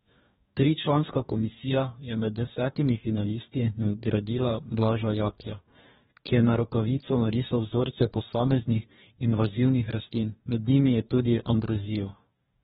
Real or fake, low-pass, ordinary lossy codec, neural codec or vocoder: fake; 19.8 kHz; AAC, 16 kbps; codec, 44.1 kHz, 2.6 kbps, DAC